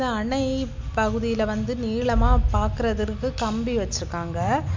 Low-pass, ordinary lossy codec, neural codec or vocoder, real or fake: 7.2 kHz; MP3, 64 kbps; none; real